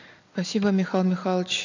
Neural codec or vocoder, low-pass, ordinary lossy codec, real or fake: none; 7.2 kHz; none; real